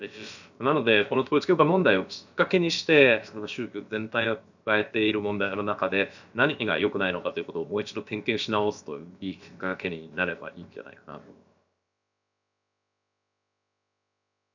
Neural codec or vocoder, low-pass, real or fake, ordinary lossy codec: codec, 16 kHz, about 1 kbps, DyCAST, with the encoder's durations; 7.2 kHz; fake; none